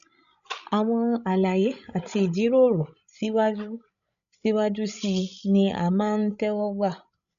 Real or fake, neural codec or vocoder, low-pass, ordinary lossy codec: fake; codec, 16 kHz, 16 kbps, FreqCodec, larger model; 7.2 kHz; AAC, 96 kbps